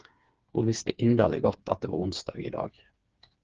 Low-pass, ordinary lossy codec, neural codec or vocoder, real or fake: 7.2 kHz; Opus, 16 kbps; codec, 16 kHz, 4 kbps, FreqCodec, smaller model; fake